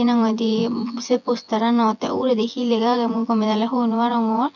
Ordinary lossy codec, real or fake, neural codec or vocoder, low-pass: none; fake; vocoder, 24 kHz, 100 mel bands, Vocos; 7.2 kHz